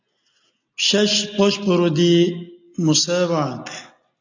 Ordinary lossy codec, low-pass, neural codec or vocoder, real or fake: AAC, 48 kbps; 7.2 kHz; none; real